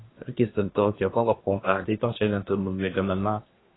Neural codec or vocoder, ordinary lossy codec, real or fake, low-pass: codec, 24 kHz, 1 kbps, SNAC; AAC, 16 kbps; fake; 7.2 kHz